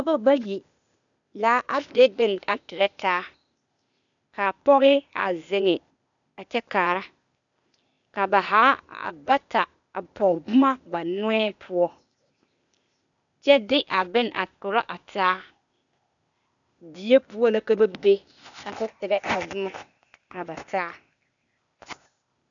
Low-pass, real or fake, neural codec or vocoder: 7.2 kHz; fake; codec, 16 kHz, 0.8 kbps, ZipCodec